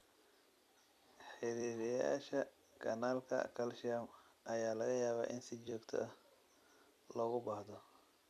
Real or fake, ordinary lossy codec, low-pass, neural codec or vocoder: fake; none; 14.4 kHz; vocoder, 44.1 kHz, 128 mel bands every 256 samples, BigVGAN v2